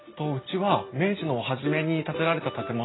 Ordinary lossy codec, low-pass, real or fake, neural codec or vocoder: AAC, 16 kbps; 7.2 kHz; real; none